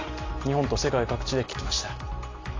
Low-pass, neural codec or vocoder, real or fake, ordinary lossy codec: 7.2 kHz; none; real; AAC, 32 kbps